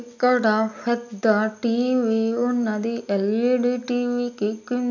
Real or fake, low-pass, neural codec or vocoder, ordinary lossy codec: real; 7.2 kHz; none; none